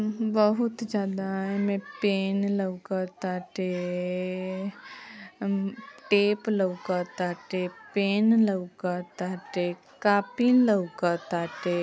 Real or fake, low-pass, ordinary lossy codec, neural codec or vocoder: real; none; none; none